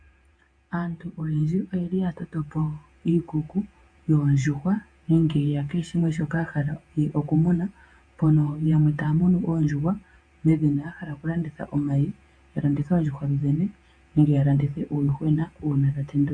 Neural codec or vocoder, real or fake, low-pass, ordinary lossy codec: vocoder, 48 kHz, 128 mel bands, Vocos; fake; 9.9 kHz; AAC, 64 kbps